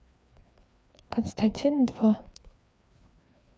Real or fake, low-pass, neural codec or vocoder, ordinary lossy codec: fake; none; codec, 16 kHz, 2 kbps, FreqCodec, larger model; none